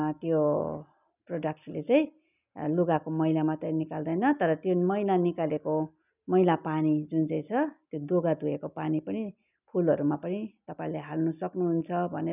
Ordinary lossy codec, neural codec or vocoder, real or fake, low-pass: none; none; real; 3.6 kHz